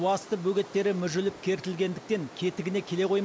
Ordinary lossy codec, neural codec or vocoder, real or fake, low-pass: none; none; real; none